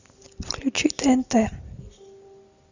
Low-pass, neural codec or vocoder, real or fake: 7.2 kHz; none; real